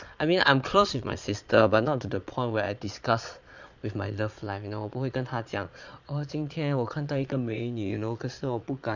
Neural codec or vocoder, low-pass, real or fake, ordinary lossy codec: vocoder, 22.05 kHz, 80 mel bands, Vocos; 7.2 kHz; fake; none